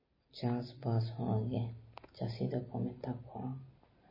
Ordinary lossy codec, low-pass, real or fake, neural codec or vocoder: MP3, 24 kbps; 5.4 kHz; real; none